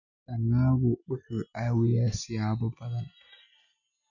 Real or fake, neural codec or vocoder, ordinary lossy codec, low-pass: real; none; none; 7.2 kHz